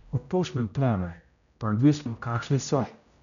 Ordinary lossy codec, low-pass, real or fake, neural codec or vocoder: none; 7.2 kHz; fake; codec, 16 kHz, 0.5 kbps, X-Codec, HuBERT features, trained on general audio